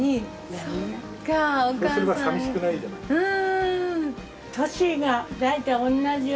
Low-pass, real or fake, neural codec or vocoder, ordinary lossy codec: none; real; none; none